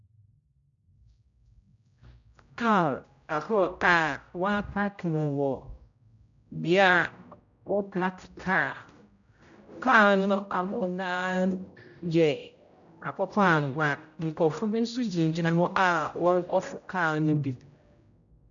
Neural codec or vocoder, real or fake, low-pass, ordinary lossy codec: codec, 16 kHz, 0.5 kbps, X-Codec, HuBERT features, trained on general audio; fake; 7.2 kHz; MP3, 96 kbps